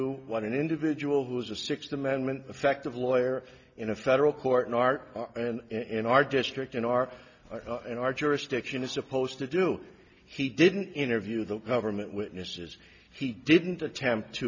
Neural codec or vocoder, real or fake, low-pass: none; real; 7.2 kHz